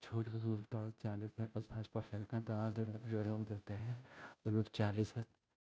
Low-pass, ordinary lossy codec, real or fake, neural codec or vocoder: none; none; fake; codec, 16 kHz, 0.5 kbps, FunCodec, trained on Chinese and English, 25 frames a second